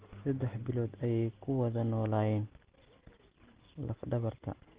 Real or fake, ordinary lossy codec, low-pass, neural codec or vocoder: real; Opus, 16 kbps; 3.6 kHz; none